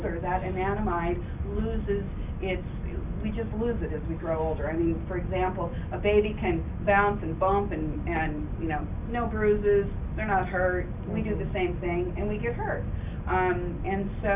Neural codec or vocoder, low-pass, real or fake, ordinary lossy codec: none; 3.6 kHz; real; Opus, 64 kbps